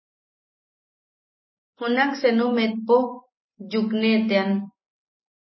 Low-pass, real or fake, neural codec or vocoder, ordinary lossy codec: 7.2 kHz; real; none; MP3, 24 kbps